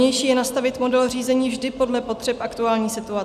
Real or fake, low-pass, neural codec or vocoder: real; 14.4 kHz; none